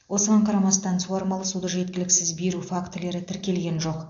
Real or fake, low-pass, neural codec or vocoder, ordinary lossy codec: real; 7.2 kHz; none; AAC, 48 kbps